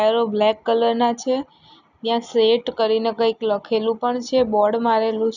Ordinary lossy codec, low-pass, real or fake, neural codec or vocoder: none; 7.2 kHz; real; none